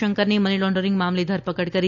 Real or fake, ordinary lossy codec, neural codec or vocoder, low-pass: real; none; none; 7.2 kHz